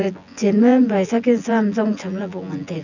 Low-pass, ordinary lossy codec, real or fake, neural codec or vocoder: 7.2 kHz; none; fake; vocoder, 24 kHz, 100 mel bands, Vocos